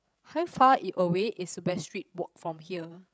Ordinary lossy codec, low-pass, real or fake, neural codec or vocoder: none; none; fake; codec, 16 kHz, 16 kbps, FreqCodec, larger model